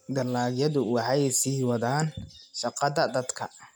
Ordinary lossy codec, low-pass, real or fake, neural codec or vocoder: none; none; real; none